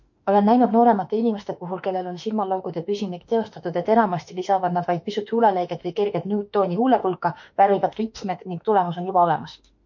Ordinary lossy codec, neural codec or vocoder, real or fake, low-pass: MP3, 48 kbps; autoencoder, 48 kHz, 32 numbers a frame, DAC-VAE, trained on Japanese speech; fake; 7.2 kHz